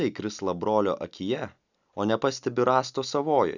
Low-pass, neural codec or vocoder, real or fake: 7.2 kHz; none; real